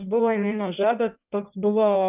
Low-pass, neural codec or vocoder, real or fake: 3.6 kHz; codec, 16 kHz in and 24 kHz out, 1.1 kbps, FireRedTTS-2 codec; fake